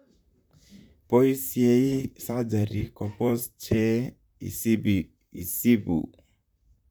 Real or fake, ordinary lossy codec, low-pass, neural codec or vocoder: fake; none; none; vocoder, 44.1 kHz, 128 mel bands, Pupu-Vocoder